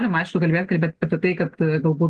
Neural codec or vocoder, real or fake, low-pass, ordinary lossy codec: none; real; 10.8 kHz; Opus, 16 kbps